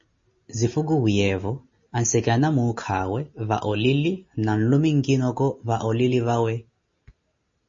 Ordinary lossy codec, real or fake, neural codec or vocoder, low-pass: MP3, 32 kbps; real; none; 7.2 kHz